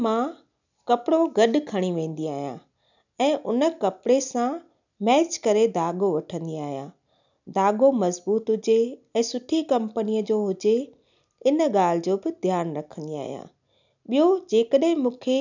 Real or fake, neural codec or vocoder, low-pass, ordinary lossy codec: real; none; 7.2 kHz; none